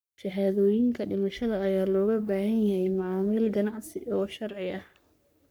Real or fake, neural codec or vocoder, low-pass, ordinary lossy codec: fake; codec, 44.1 kHz, 3.4 kbps, Pupu-Codec; none; none